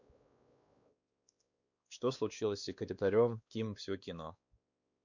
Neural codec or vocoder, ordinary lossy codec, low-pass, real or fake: codec, 16 kHz, 2 kbps, X-Codec, WavLM features, trained on Multilingual LibriSpeech; none; 7.2 kHz; fake